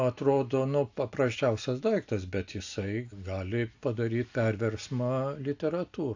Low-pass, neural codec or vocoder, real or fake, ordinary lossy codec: 7.2 kHz; none; real; AAC, 48 kbps